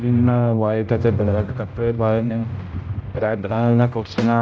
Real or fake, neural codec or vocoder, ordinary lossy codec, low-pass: fake; codec, 16 kHz, 0.5 kbps, X-Codec, HuBERT features, trained on general audio; none; none